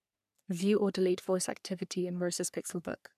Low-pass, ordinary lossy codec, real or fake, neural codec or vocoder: 14.4 kHz; none; fake; codec, 44.1 kHz, 3.4 kbps, Pupu-Codec